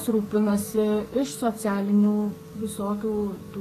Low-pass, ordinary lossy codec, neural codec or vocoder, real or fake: 14.4 kHz; AAC, 48 kbps; codec, 44.1 kHz, 2.6 kbps, SNAC; fake